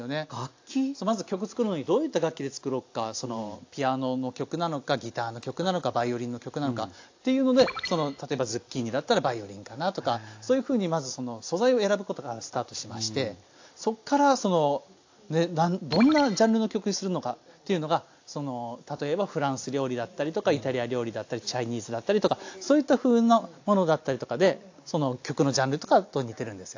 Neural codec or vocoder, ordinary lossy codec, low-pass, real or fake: none; AAC, 48 kbps; 7.2 kHz; real